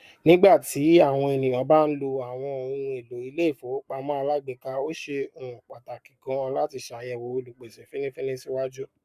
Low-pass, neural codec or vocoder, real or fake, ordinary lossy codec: 14.4 kHz; codec, 44.1 kHz, 7.8 kbps, Pupu-Codec; fake; none